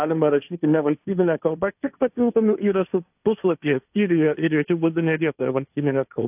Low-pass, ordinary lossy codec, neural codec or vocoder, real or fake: 3.6 kHz; AAC, 32 kbps; codec, 16 kHz, 1.1 kbps, Voila-Tokenizer; fake